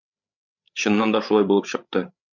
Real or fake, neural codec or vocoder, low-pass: fake; codec, 16 kHz, 8 kbps, FreqCodec, larger model; 7.2 kHz